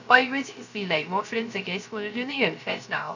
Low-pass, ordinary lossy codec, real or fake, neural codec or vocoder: 7.2 kHz; none; fake; codec, 16 kHz, 0.3 kbps, FocalCodec